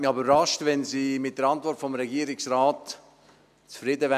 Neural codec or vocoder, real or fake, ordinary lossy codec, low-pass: none; real; none; 14.4 kHz